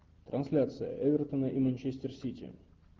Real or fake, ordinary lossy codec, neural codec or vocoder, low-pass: real; Opus, 16 kbps; none; 7.2 kHz